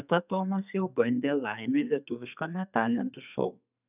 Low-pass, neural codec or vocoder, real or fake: 3.6 kHz; codec, 32 kHz, 1.9 kbps, SNAC; fake